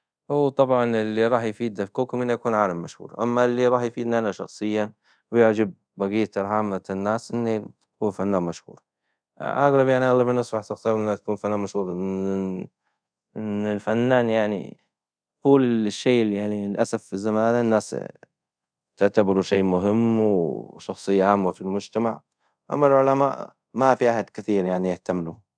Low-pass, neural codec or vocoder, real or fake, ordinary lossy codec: 9.9 kHz; codec, 24 kHz, 0.5 kbps, DualCodec; fake; none